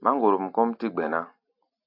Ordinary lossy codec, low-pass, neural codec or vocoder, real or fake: AAC, 48 kbps; 5.4 kHz; none; real